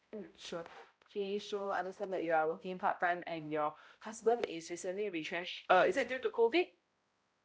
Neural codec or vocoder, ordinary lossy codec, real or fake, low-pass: codec, 16 kHz, 0.5 kbps, X-Codec, HuBERT features, trained on balanced general audio; none; fake; none